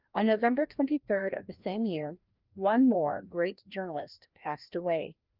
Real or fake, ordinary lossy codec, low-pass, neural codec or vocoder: fake; Opus, 24 kbps; 5.4 kHz; codec, 16 kHz, 1 kbps, FreqCodec, larger model